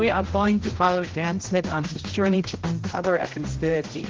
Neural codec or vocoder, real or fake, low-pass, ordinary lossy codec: codec, 16 kHz, 0.5 kbps, X-Codec, HuBERT features, trained on general audio; fake; 7.2 kHz; Opus, 16 kbps